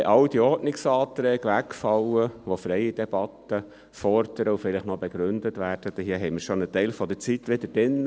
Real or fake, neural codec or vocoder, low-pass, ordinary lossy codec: real; none; none; none